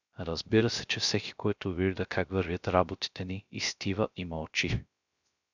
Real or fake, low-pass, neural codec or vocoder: fake; 7.2 kHz; codec, 16 kHz, 0.3 kbps, FocalCodec